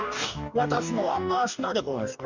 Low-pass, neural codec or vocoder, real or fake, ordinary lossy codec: 7.2 kHz; codec, 44.1 kHz, 2.6 kbps, DAC; fake; none